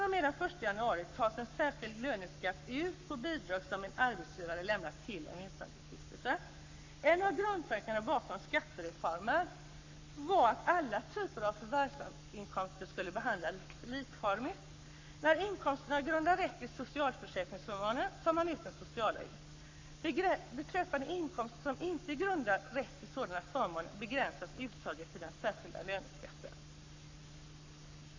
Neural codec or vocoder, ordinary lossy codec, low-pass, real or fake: codec, 44.1 kHz, 7.8 kbps, Pupu-Codec; AAC, 48 kbps; 7.2 kHz; fake